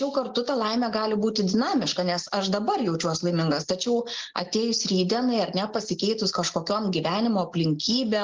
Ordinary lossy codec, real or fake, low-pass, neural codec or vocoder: Opus, 16 kbps; real; 7.2 kHz; none